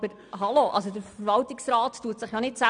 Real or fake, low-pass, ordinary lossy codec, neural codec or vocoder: real; 9.9 kHz; none; none